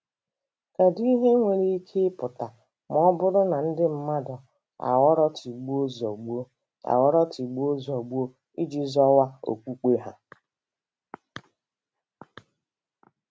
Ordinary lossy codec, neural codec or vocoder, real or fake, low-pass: none; none; real; none